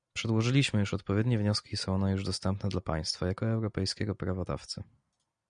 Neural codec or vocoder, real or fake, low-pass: none; real; 9.9 kHz